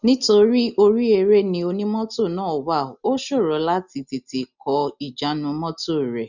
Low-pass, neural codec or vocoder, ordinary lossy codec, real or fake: 7.2 kHz; none; none; real